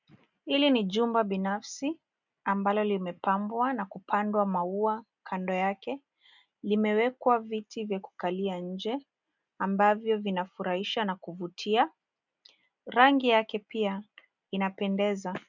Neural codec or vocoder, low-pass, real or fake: none; 7.2 kHz; real